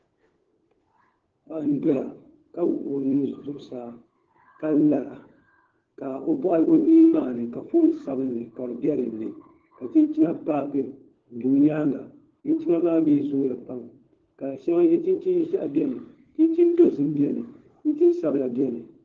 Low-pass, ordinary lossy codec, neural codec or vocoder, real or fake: 7.2 kHz; Opus, 16 kbps; codec, 16 kHz, 4 kbps, FunCodec, trained on LibriTTS, 50 frames a second; fake